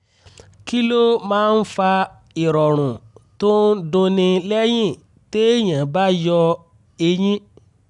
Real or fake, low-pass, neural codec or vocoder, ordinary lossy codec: real; 10.8 kHz; none; none